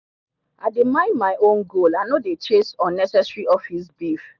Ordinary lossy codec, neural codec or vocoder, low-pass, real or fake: none; none; 7.2 kHz; real